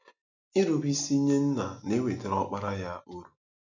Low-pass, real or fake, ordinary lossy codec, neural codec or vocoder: 7.2 kHz; real; none; none